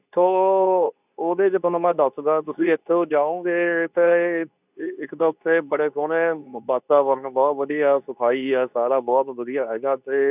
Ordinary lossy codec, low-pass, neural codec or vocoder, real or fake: none; 3.6 kHz; codec, 24 kHz, 0.9 kbps, WavTokenizer, medium speech release version 2; fake